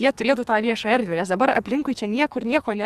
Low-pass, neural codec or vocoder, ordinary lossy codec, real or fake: 14.4 kHz; codec, 44.1 kHz, 2.6 kbps, SNAC; Opus, 64 kbps; fake